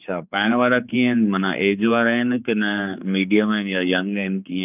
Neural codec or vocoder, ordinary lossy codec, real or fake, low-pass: codec, 16 kHz, 4 kbps, X-Codec, HuBERT features, trained on general audio; none; fake; 3.6 kHz